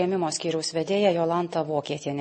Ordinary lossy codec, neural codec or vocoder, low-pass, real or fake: MP3, 32 kbps; none; 10.8 kHz; real